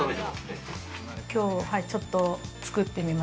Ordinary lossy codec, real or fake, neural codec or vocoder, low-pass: none; real; none; none